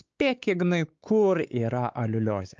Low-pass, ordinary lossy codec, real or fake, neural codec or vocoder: 7.2 kHz; Opus, 24 kbps; fake; codec, 16 kHz, 4 kbps, X-Codec, WavLM features, trained on Multilingual LibriSpeech